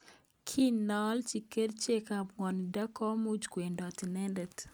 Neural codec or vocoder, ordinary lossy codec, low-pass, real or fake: none; none; none; real